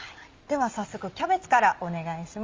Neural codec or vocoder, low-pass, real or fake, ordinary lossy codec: none; 7.2 kHz; real; Opus, 32 kbps